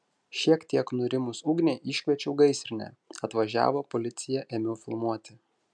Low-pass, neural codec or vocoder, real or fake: 9.9 kHz; none; real